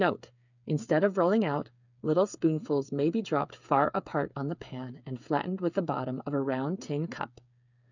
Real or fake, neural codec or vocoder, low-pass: fake; codec, 16 kHz, 8 kbps, FreqCodec, smaller model; 7.2 kHz